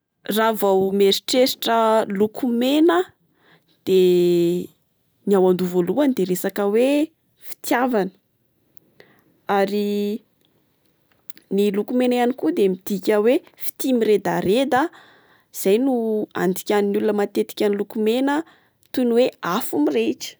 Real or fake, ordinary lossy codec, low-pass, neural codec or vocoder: real; none; none; none